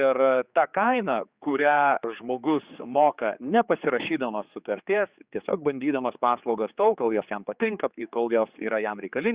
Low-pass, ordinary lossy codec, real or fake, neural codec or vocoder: 3.6 kHz; Opus, 64 kbps; fake; codec, 16 kHz, 4 kbps, X-Codec, HuBERT features, trained on general audio